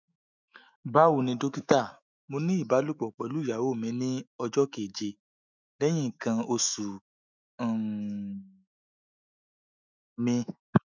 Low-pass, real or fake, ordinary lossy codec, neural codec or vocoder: 7.2 kHz; fake; none; autoencoder, 48 kHz, 128 numbers a frame, DAC-VAE, trained on Japanese speech